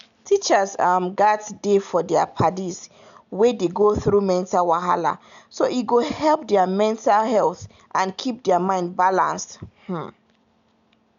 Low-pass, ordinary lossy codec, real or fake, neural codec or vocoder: 7.2 kHz; none; real; none